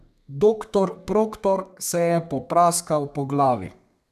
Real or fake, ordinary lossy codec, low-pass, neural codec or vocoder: fake; none; 14.4 kHz; codec, 32 kHz, 1.9 kbps, SNAC